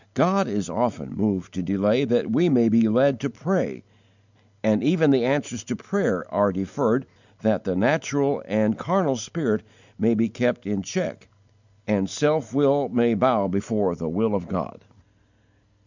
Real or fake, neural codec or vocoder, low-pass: real; none; 7.2 kHz